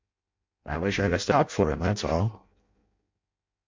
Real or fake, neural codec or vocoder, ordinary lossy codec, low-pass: fake; codec, 16 kHz in and 24 kHz out, 0.6 kbps, FireRedTTS-2 codec; MP3, 48 kbps; 7.2 kHz